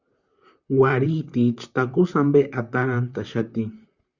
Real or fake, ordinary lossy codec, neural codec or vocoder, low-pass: fake; Opus, 64 kbps; vocoder, 44.1 kHz, 128 mel bands, Pupu-Vocoder; 7.2 kHz